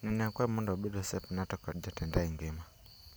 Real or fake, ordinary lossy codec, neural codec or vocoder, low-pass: real; none; none; none